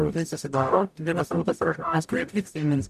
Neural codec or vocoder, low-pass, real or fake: codec, 44.1 kHz, 0.9 kbps, DAC; 14.4 kHz; fake